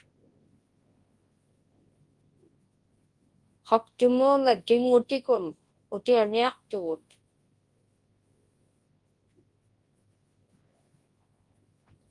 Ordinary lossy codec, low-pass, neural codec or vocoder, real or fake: Opus, 24 kbps; 10.8 kHz; codec, 24 kHz, 0.9 kbps, WavTokenizer, large speech release; fake